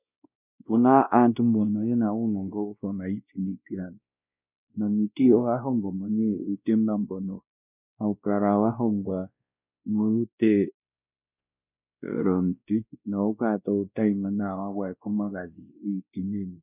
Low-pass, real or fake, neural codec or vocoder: 3.6 kHz; fake; codec, 16 kHz, 1 kbps, X-Codec, WavLM features, trained on Multilingual LibriSpeech